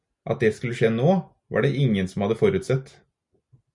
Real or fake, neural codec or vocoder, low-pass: real; none; 10.8 kHz